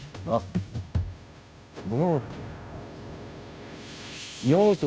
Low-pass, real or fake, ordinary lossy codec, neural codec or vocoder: none; fake; none; codec, 16 kHz, 0.5 kbps, FunCodec, trained on Chinese and English, 25 frames a second